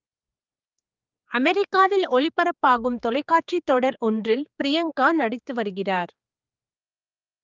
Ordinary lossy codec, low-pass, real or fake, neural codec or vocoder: Opus, 24 kbps; 7.2 kHz; fake; codec, 16 kHz, 4 kbps, X-Codec, HuBERT features, trained on general audio